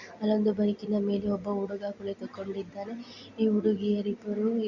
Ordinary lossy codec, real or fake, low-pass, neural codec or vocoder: Opus, 64 kbps; real; 7.2 kHz; none